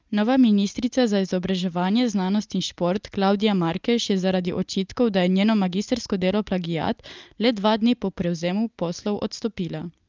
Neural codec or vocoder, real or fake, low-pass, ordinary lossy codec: none; real; 7.2 kHz; Opus, 24 kbps